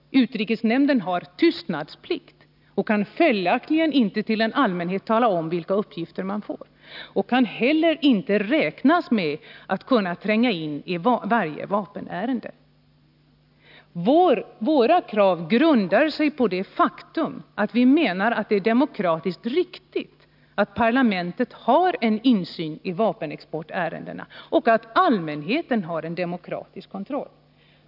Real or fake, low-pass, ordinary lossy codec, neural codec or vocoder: real; 5.4 kHz; none; none